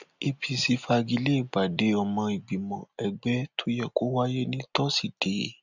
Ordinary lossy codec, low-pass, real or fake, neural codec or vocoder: none; 7.2 kHz; real; none